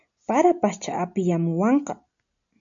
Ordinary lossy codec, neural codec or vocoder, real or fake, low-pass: AAC, 64 kbps; none; real; 7.2 kHz